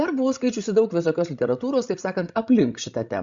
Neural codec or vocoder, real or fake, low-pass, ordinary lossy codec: codec, 16 kHz, 16 kbps, FreqCodec, larger model; fake; 7.2 kHz; Opus, 64 kbps